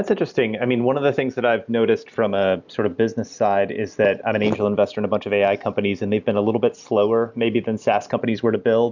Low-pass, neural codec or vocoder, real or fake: 7.2 kHz; none; real